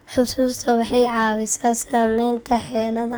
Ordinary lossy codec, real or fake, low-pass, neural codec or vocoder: none; fake; none; codec, 44.1 kHz, 2.6 kbps, SNAC